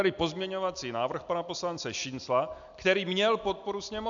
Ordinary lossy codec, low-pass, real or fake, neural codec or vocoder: AAC, 64 kbps; 7.2 kHz; real; none